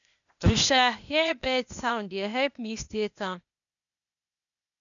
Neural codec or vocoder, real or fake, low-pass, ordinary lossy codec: codec, 16 kHz, 0.8 kbps, ZipCodec; fake; 7.2 kHz; none